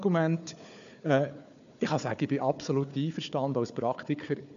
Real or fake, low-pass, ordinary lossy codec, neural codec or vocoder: fake; 7.2 kHz; MP3, 96 kbps; codec, 16 kHz, 4 kbps, FunCodec, trained on Chinese and English, 50 frames a second